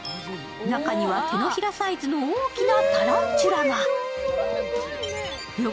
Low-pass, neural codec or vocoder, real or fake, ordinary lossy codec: none; none; real; none